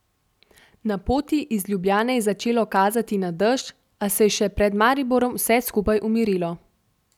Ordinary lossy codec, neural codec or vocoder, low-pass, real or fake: none; none; 19.8 kHz; real